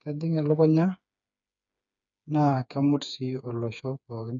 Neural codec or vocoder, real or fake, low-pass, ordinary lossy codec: codec, 16 kHz, 4 kbps, FreqCodec, smaller model; fake; 7.2 kHz; none